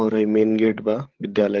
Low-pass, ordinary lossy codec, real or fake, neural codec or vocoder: 7.2 kHz; Opus, 16 kbps; fake; codec, 16 kHz, 16 kbps, FreqCodec, larger model